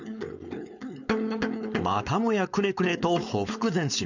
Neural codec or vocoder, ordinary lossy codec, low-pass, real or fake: codec, 16 kHz, 4.8 kbps, FACodec; none; 7.2 kHz; fake